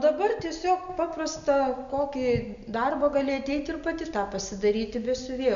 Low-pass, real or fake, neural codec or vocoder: 7.2 kHz; real; none